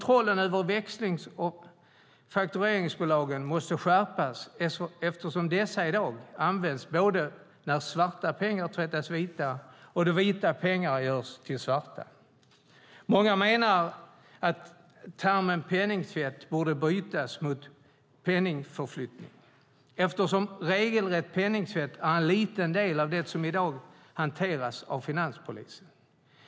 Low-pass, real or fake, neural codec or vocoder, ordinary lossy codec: none; real; none; none